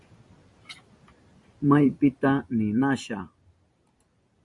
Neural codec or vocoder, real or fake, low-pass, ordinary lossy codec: vocoder, 44.1 kHz, 128 mel bands every 256 samples, BigVGAN v2; fake; 10.8 kHz; Opus, 64 kbps